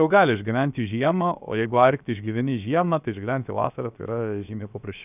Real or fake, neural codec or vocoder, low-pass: fake; codec, 16 kHz, 0.7 kbps, FocalCodec; 3.6 kHz